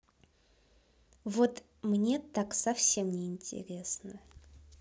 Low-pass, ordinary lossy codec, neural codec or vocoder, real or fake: none; none; none; real